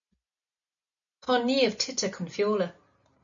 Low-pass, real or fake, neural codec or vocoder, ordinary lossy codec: 7.2 kHz; real; none; MP3, 64 kbps